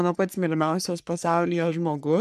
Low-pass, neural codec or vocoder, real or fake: 14.4 kHz; codec, 44.1 kHz, 3.4 kbps, Pupu-Codec; fake